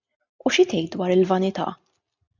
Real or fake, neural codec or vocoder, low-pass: real; none; 7.2 kHz